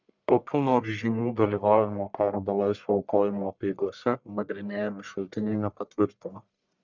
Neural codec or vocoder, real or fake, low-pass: codec, 44.1 kHz, 1.7 kbps, Pupu-Codec; fake; 7.2 kHz